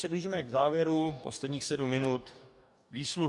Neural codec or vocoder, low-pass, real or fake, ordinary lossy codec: codec, 44.1 kHz, 2.6 kbps, DAC; 10.8 kHz; fake; MP3, 96 kbps